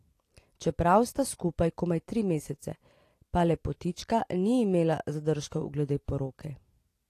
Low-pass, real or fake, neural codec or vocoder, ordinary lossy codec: 14.4 kHz; real; none; AAC, 48 kbps